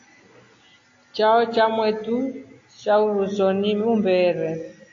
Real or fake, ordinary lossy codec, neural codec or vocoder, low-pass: real; AAC, 48 kbps; none; 7.2 kHz